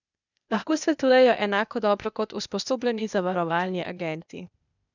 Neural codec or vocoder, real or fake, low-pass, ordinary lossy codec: codec, 16 kHz, 0.8 kbps, ZipCodec; fake; 7.2 kHz; none